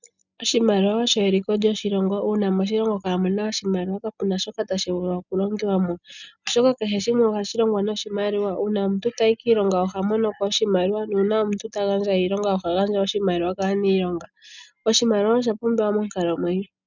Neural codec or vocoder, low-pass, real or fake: none; 7.2 kHz; real